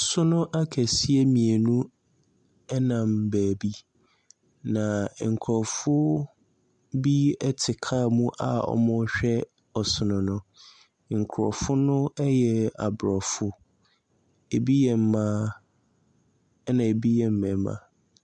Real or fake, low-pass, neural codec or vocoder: real; 10.8 kHz; none